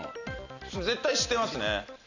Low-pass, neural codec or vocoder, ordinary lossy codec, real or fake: 7.2 kHz; none; none; real